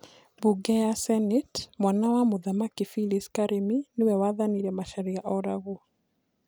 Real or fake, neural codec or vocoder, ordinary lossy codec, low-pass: real; none; none; none